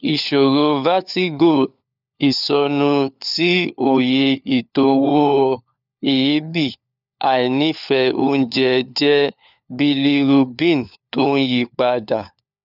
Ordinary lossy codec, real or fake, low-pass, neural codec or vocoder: none; fake; 5.4 kHz; codec, 16 kHz, 4 kbps, FunCodec, trained on LibriTTS, 50 frames a second